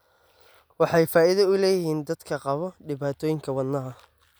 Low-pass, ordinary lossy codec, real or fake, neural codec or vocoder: none; none; real; none